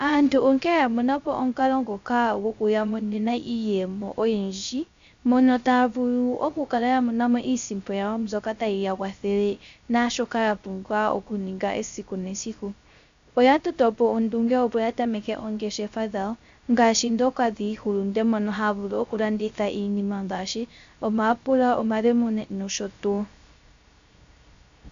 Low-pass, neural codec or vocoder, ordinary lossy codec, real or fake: 7.2 kHz; codec, 16 kHz, 0.3 kbps, FocalCodec; MP3, 64 kbps; fake